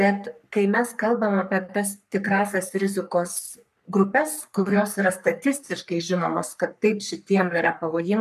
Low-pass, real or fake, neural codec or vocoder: 14.4 kHz; fake; codec, 44.1 kHz, 3.4 kbps, Pupu-Codec